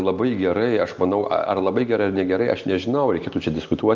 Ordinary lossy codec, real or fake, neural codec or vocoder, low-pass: Opus, 32 kbps; real; none; 7.2 kHz